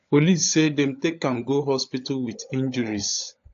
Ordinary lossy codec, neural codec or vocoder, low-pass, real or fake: AAC, 48 kbps; codec, 16 kHz, 16 kbps, FunCodec, trained on LibriTTS, 50 frames a second; 7.2 kHz; fake